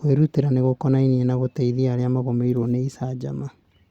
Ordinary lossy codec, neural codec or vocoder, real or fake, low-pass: Opus, 64 kbps; none; real; 19.8 kHz